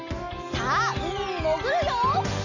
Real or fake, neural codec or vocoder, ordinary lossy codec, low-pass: real; none; none; 7.2 kHz